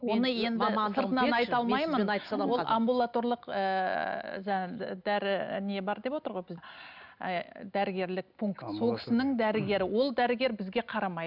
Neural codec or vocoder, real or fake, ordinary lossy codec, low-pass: none; real; none; 5.4 kHz